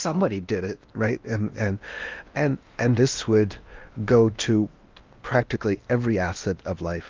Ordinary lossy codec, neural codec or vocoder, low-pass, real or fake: Opus, 24 kbps; codec, 16 kHz in and 24 kHz out, 0.8 kbps, FocalCodec, streaming, 65536 codes; 7.2 kHz; fake